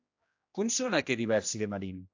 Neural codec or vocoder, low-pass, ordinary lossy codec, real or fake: codec, 16 kHz, 1 kbps, X-Codec, HuBERT features, trained on general audio; 7.2 kHz; AAC, 48 kbps; fake